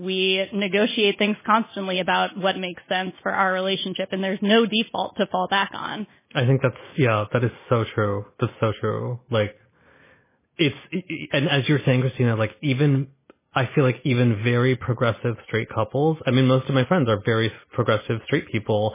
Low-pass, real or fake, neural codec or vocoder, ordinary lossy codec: 3.6 kHz; real; none; MP3, 16 kbps